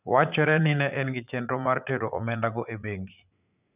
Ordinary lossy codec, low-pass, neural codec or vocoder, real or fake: none; 3.6 kHz; vocoder, 44.1 kHz, 80 mel bands, Vocos; fake